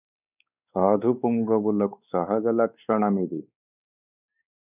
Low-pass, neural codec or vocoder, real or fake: 3.6 kHz; codec, 16 kHz, 4 kbps, X-Codec, WavLM features, trained on Multilingual LibriSpeech; fake